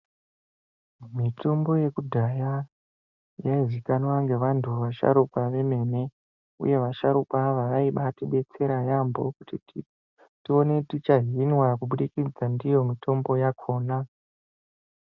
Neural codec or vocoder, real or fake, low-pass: none; real; 7.2 kHz